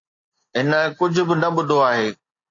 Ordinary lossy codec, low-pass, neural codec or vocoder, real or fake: AAC, 48 kbps; 7.2 kHz; none; real